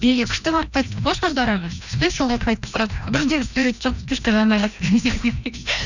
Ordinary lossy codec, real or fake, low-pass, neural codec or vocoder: none; fake; 7.2 kHz; codec, 16 kHz, 1 kbps, FreqCodec, larger model